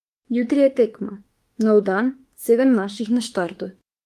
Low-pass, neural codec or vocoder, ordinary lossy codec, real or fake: 14.4 kHz; autoencoder, 48 kHz, 32 numbers a frame, DAC-VAE, trained on Japanese speech; Opus, 24 kbps; fake